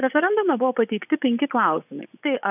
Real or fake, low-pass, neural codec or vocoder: fake; 3.6 kHz; vocoder, 24 kHz, 100 mel bands, Vocos